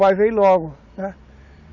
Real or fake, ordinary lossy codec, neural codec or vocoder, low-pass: real; none; none; 7.2 kHz